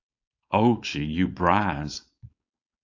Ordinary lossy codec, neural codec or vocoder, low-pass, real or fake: AAC, 48 kbps; codec, 16 kHz, 4.8 kbps, FACodec; 7.2 kHz; fake